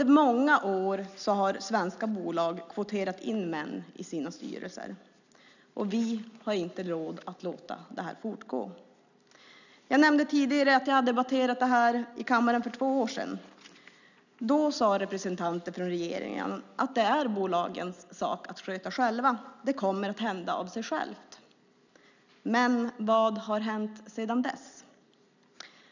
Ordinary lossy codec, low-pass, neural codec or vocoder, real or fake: none; 7.2 kHz; none; real